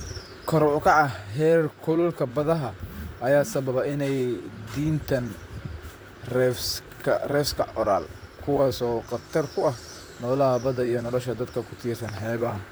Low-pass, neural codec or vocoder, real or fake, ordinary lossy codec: none; vocoder, 44.1 kHz, 128 mel bands, Pupu-Vocoder; fake; none